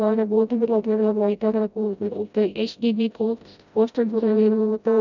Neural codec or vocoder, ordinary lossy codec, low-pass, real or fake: codec, 16 kHz, 0.5 kbps, FreqCodec, smaller model; none; 7.2 kHz; fake